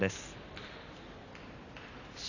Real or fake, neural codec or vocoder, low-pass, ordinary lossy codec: real; none; 7.2 kHz; none